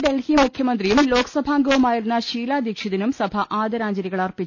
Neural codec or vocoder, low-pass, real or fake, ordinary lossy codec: none; 7.2 kHz; real; none